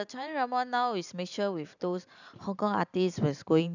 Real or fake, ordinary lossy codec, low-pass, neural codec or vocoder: fake; none; 7.2 kHz; vocoder, 44.1 kHz, 128 mel bands every 512 samples, BigVGAN v2